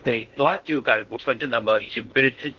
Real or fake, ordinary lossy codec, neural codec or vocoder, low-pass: fake; Opus, 16 kbps; codec, 16 kHz in and 24 kHz out, 0.6 kbps, FocalCodec, streaming, 4096 codes; 7.2 kHz